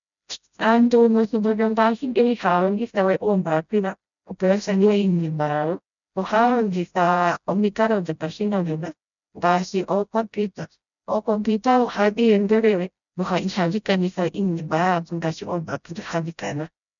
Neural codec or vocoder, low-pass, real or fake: codec, 16 kHz, 0.5 kbps, FreqCodec, smaller model; 7.2 kHz; fake